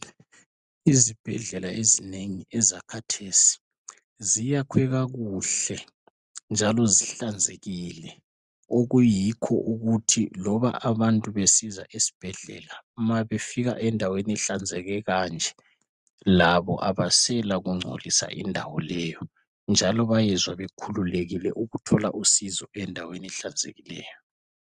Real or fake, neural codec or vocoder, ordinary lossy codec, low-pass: real; none; Opus, 64 kbps; 10.8 kHz